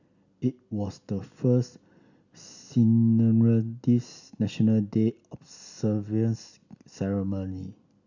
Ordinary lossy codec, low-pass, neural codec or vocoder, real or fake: none; 7.2 kHz; none; real